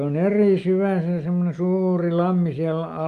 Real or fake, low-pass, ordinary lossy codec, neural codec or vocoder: real; 14.4 kHz; Opus, 32 kbps; none